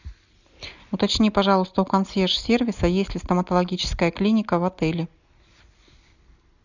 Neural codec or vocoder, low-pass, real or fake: none; 7.2 kHz; real